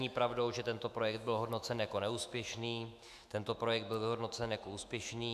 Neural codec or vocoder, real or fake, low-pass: autoencoder, 48 kHz, 128 numbers a frame, DAC-VAE, trained on Japanese speech; fake; 14.4 kHz